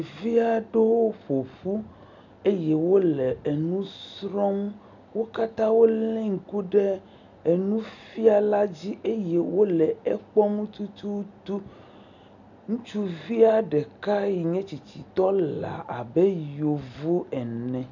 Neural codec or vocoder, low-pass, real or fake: none; 7.2 kHz; real